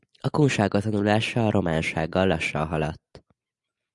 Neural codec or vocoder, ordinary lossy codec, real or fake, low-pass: none; MP3, 96 kbps; real; 10.8 kHz